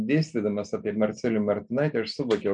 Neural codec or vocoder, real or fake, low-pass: none; real; 9.9 kHz